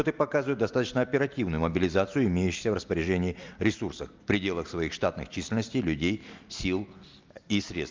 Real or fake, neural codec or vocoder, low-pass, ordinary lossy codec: real; none; 7.2 kHz; Opus, 24 kbps